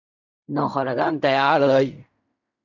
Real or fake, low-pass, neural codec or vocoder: fake; 7.2 kHz; codec, 16 kHz in and 24 kHz out, 0.4 kbps, LongCat-Audio-Codec, fine tuned four codebook decoder